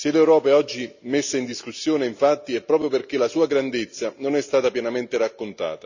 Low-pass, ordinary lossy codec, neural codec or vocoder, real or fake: 7.2 kHz; none; none; real